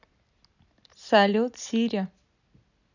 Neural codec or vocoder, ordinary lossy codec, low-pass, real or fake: none; none; 7.2 kHz; real